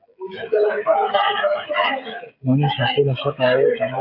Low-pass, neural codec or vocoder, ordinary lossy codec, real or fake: 5.4 kHz; codec, 16 kHz, 16 kbps, FreqCodec, smaller model; AAC, 48 kbps; fake